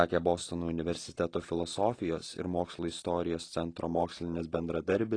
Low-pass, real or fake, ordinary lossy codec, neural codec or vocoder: 9.9 kHz; real; AAC, 32 kbps; none